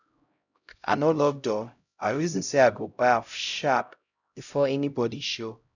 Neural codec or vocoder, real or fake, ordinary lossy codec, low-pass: codec, 16 kHz, 0.5 kbps, X-Codec, HuBERT features, trained on LibriSpeech; fake; none; 7.2 kHz